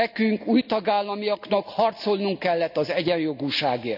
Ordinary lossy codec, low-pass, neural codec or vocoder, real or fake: none; 5.4 kHz; none; real